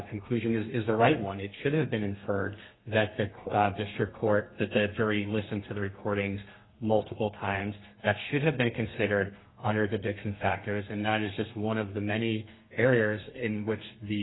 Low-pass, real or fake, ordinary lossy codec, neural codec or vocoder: 7.2 kHz; fake; AAC, 16 kbps; codec, 32 kHz, 1.9 kbps, SNAC